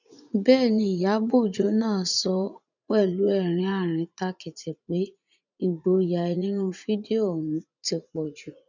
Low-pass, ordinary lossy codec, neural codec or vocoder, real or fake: 7.2 kHz; none; vocoder, 44.1 kHz, 80 mel bands, Vocos; fake